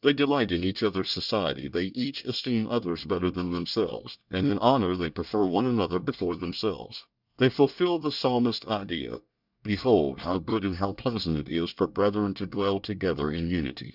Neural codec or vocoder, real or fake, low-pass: codec, 24 kHz, 1 kbps, SNAC; fake; 5.4 kHz